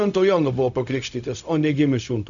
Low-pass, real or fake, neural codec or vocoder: 7.2 kHz; fake; codec, 16 kHz, 0.4 kbps, LongCat-Audio-Codec